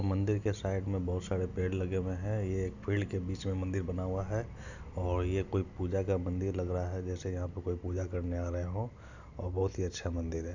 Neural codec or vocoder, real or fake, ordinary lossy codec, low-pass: none; real; none; 7.2 kHz